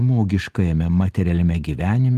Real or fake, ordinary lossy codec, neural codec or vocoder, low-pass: real; Opus, 24 kbps; none; 14.4 kHz